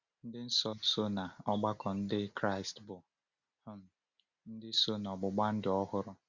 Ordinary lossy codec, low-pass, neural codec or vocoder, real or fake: Opus, 64 kbps; 7.2 kHz; none; real